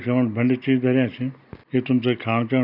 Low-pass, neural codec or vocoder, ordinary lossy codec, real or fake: 5.4 kHz; none; none; real